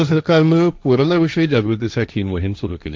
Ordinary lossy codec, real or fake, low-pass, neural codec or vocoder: none; fake; none; codec, 16 kHz, 1.1 kbps, Voila-Tokenizer